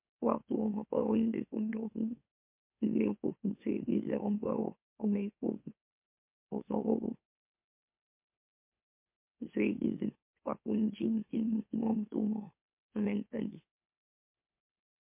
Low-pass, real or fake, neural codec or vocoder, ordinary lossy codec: 3.6 kHz; fake; autoencoder, 44.1 kHz, a latent of 192 numbers a frame, MeloTTS; MP3, 32 kbps